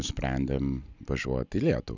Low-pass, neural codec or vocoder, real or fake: 7.2 kHz; none; real